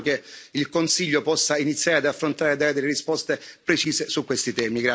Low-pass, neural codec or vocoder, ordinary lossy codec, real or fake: none; none; none; real